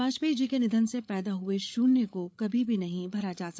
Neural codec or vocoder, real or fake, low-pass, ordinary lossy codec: codec, 16 kHz, 8 kbps, FreqCodec, larger model; fake; none; none